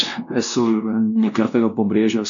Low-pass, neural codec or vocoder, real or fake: 7.2 kHz; codec, 16 kHz, 1 kbps, X-Codec, WavLM features, trained on Multilingual LibriSpeech; fake